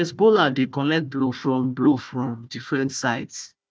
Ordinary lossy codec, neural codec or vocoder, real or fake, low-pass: none; codec, 16 kHz, 1 kbps, FunCodec, trained on Chinese and English, 50 frames a second; fake; none